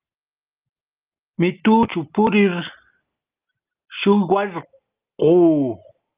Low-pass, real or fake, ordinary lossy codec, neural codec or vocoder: 3.6 kHz; real; Opus, 24 kbps; none